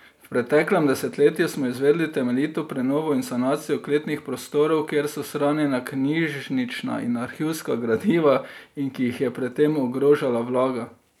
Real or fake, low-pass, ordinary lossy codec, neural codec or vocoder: real; 19.8 kHz; none; none